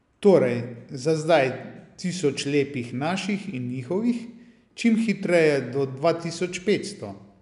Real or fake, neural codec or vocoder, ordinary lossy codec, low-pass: real; none; none; 10.8 kHz